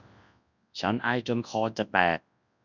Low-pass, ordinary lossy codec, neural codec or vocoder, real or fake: 7.2 kHz; none; codec, 24 kHz, 0.9 kbps, WavTokenizer, large speech release; fake